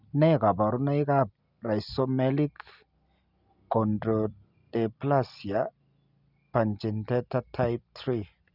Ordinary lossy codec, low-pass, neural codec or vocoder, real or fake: none; 5.4 kHz; none; real